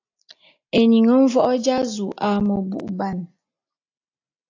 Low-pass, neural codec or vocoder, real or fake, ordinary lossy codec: 7.2 kHz; none; real; AAC, 48 kbps